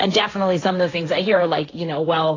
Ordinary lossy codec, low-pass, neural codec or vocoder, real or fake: AAC, 32 kbps; 7.2 kHz; codec, 16 kHz, 1.1 kbps, Voila-Tokenizer; fake